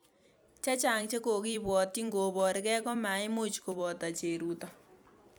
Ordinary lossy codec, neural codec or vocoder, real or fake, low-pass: none; vocoder, 44.1 kHz, 128 mel bands every 256 samples, BigVGAN v2; fake; none